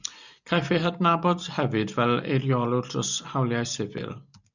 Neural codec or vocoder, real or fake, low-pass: none; real; 7.2 kHz